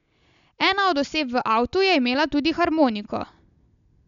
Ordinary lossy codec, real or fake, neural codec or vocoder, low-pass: none; real; none; 7.2 kHz